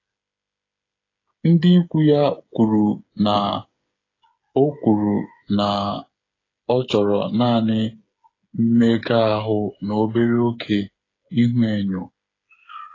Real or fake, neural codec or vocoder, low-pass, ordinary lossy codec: fake; codec, 16 kHz, 8 kbps, FreqCodec, smaller model; 7.2 kHz; AAC, 32 kbps